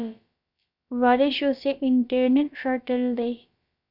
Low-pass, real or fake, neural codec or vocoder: 5.4 kHz; fake; codec, 16 kHz, about 1 kbps, DyCAST, with the encoder's durations